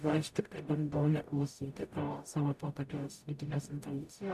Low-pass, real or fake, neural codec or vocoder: 14.4 kHz; fake; codec, 44.1 kHz, 0.9 kbps, DAC